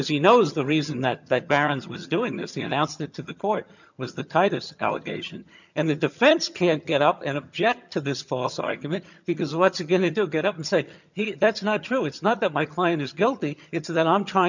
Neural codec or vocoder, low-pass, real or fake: vocoder, 22.05 kHz, 80 mel bands, HiFi-GAN; 7.2 kHz; fake